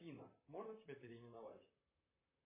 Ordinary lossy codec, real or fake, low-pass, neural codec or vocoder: MP3, 16 kbps; fake; 3.6 kHz; vocoder, 44.1 kHz, 128 mel bands, Pupu-Vocoder